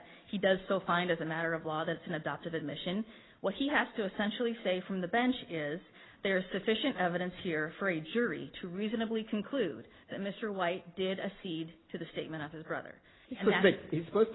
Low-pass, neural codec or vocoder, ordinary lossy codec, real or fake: 7.2 kHz; none; AAC, 16 kbps; real